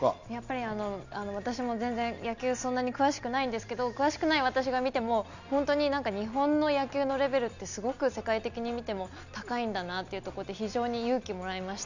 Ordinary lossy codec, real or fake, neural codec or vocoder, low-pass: none; real; none; 7.2 kHz